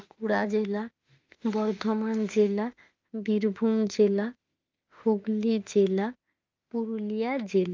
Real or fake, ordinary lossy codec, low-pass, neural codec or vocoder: fake; Opus, 24 kbps; 7.2 kHz; autoencoder, 48 kHz, 32 numbers a frame, DAC-VAE, trained on Japanese speech